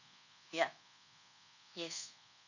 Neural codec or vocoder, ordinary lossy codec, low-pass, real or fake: codec, 24 kHz, 1.2 kbps, DualCodec; none; 7.2 kHz; fake